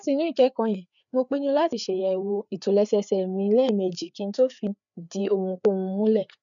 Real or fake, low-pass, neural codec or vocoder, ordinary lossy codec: fake; 7.2 kHz; codec, 16 kHz, 4 kbps, FreqCodec, larger model; none